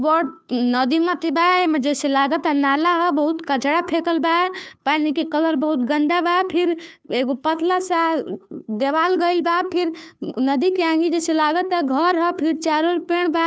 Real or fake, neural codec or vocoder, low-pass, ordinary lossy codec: fake; codec, 16 kHz, 2 kbps, FunCodec, trained on Chinese and English, 25 frames a second; none; none